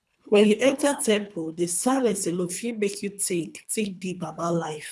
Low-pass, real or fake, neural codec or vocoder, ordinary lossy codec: none; fake; codec, 24 kHz, 3 kbps, HILCodec; none